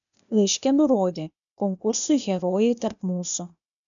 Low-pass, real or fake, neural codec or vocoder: 7.2 kHz; fake; codec, 16 kHz, 0.8 kbps, ZipCodec